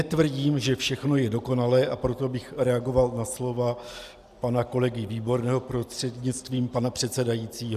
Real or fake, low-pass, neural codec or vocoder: real; 14.4 kHz; none